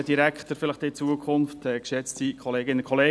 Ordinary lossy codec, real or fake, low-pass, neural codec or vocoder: none; real; none; none